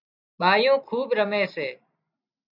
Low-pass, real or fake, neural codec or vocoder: 5.4 kHz; real; none